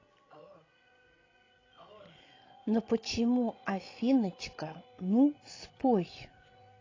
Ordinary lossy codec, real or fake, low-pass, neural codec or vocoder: AAC, 32 kbps; fake; 7.2 kHz; codec, 16 kHz, 16 kbps, FreqCodec, larger model